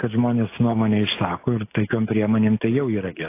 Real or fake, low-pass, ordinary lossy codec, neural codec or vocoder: real; 3.6 kHz; AAC, 24 kbps; none